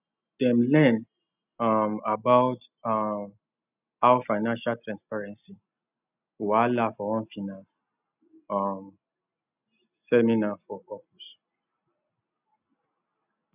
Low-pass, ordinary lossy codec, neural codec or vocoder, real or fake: 3.6 kHz; none; none; real